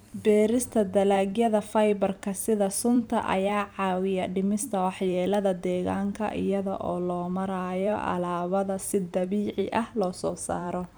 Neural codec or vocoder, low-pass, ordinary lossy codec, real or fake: vocoder, 44.1 kHz, 128 mel bands every 512 samples, BigVGAN v2; none; none; fake